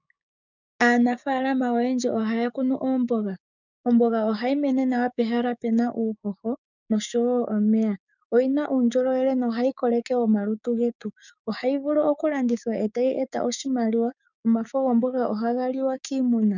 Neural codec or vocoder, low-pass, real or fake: codec, 16 kHz, 6 kbps, DAC; 7.2 kHz; fake